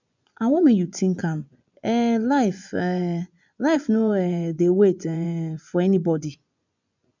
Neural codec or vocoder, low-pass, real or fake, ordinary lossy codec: vocoder, 24 kHz, 100 mel bands, Vocos; 7.2 kHz; fake; none